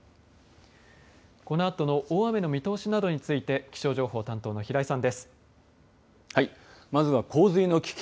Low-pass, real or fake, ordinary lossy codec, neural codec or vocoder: none; real; none; none